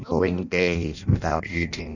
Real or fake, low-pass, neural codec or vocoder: fake; 7.2 kHz; codec, 16 kHz in and 24 kHz out, 0.6 kbps, FireRedTTS-2 codec